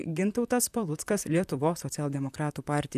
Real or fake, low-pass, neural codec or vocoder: fake; 14.4 kHz; vocoder, 44.1 kHz, 128 mel bands, Pupu-Vocoder